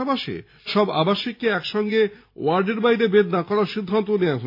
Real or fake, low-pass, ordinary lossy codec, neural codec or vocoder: real; 5.4 kHz; AAC, 32 kbps; none